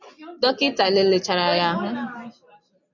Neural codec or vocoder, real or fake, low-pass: none; real; 7.2 kHz